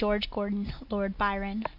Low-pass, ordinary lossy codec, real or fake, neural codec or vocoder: 5.4 kHz; AAC, 48 kbps; real; none